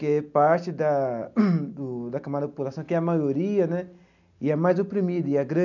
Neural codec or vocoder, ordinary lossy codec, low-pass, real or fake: none; none; 7.2 kHz; real